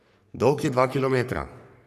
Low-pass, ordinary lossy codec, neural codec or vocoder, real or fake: 14.4 kHz; none; codec, 44.1 kHz, 3.4 kbps, Pupu-Codec; fake